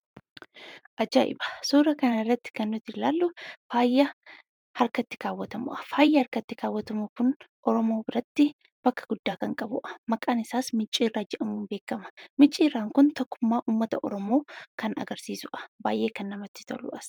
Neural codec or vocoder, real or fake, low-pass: vocoder, 44.1 kHz, 128 mel bands every 512 samples, BigVGAN v2; fake; 19.8 kHz